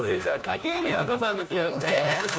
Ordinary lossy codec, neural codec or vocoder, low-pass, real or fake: none; codec, 16 kHz, 1 kbps, FunCodec, trained on LibriTTS, 50 frames a second; none; fake